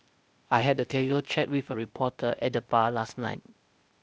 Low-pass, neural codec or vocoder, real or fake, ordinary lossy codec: none; codec, 16 kHz, 0.8 kbps, ZipCodec; fake; none